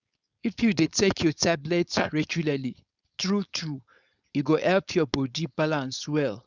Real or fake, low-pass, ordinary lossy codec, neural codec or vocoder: fake; 7.2 kHz; Opus, 64 kbps; codec, 16 kHz, 4.8 kbps, FACodec